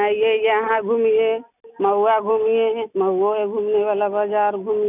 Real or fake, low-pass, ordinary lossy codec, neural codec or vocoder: real; 3.6 kHz; none; none